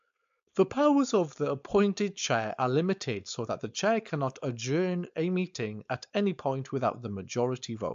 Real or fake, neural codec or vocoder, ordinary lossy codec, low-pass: fake; codec, 16 kHz, 4.8 kbps, FACodec; AAC, 64 kbps; 7.2 kHz